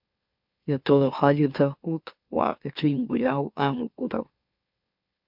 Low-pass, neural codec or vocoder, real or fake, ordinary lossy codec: 5.4 kHz; autoencoder, 44.1 kHz, a latent of 192 numbers a frame, MeloTTS; fake; MP3, 48 kbps